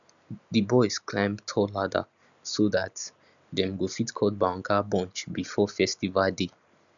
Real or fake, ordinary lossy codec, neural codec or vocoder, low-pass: real; none; none; 7.2 kHz